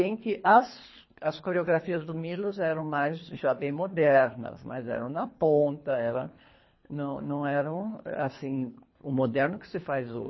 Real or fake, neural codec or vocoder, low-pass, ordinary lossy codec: fake; codec, 24 kHz, 3 kbps, HILCodec; 7.2 kHz; MP3, 24 kbps